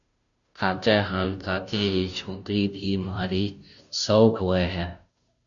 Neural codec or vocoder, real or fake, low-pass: codec, 16 kHz, 0.5 kbps, FunCodec, trained on Chinese and English, 25 frames a second; fake; 7.2 kHz